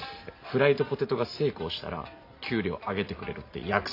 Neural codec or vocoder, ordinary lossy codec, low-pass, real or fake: none; AAC, 32 kbps; 5.4 kHz; real